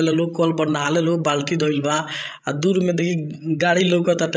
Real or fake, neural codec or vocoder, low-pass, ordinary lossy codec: fake; codec, 16 kHz, 16 kbps, FreqCodec, larger model; none; none